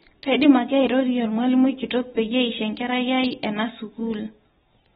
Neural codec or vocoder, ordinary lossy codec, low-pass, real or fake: none; AAC, 16 kbps; 9.9 kHz; real